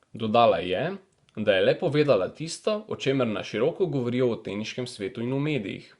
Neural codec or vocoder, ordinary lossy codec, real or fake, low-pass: vocoder, 24 kHz, 100 mel bands, Vocos; Opus, 64 kbps; fake; 10.8 kHz